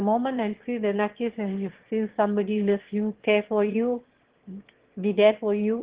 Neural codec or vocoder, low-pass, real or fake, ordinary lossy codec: autoencoder, 22.05 kHz, a latent of 192 numbers a frame, VITS, trained on one speaker; 3.6 kHz; fake; Opus, 16 kbps